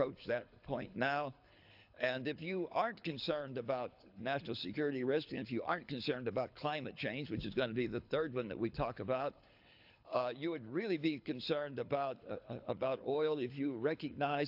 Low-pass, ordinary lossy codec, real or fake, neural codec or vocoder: 5.4 kHz; AAC, 48 kbps; fake; codec, 24 kHz, 6 kbps, HILCodec